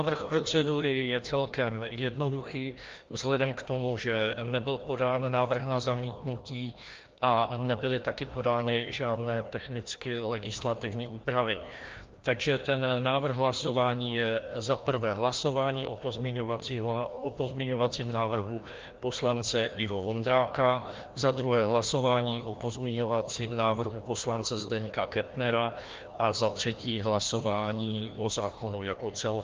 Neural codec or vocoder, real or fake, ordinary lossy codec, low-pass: codec, 16 kHz, 1 kbps, FreqCodec, larger model; fake; Opus, 32 kbps; 7.2 kHz